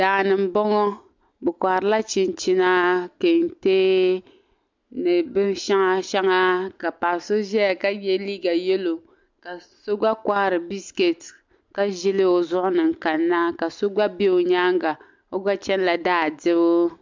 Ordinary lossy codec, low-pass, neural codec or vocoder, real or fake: MP3, 64 kbps; 7.2 kHz; none; real